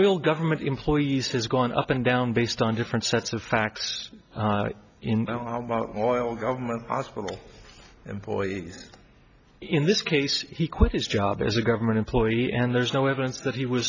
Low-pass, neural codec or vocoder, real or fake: 7.2 kHz; none; real